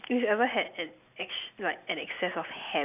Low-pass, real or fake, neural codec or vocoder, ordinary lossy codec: 3.6 kHz; real; none; none